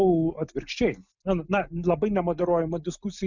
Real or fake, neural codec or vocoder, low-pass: real; none; 7.2 kHz